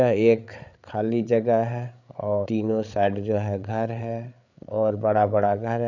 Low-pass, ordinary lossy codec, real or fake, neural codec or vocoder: 7.2 kHz; none; fake; codec, 16 kHz, 8 kbps, FreqCodec, larger model